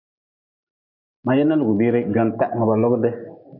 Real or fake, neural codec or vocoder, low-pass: real; none; 5.4 kHz